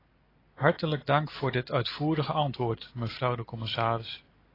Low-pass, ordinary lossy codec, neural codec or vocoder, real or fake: 5.4 kHz; AAC, 24 kbps; none; real